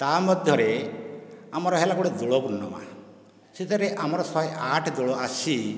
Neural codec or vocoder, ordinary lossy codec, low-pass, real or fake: none; none; none; real